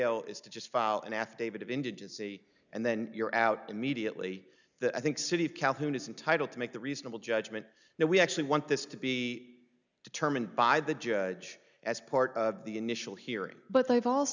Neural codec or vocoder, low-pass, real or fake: none; 7.2 kHz; real